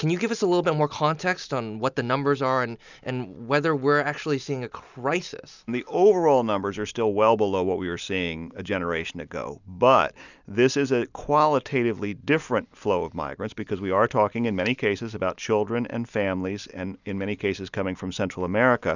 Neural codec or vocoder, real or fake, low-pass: none; real; 7.2 kHz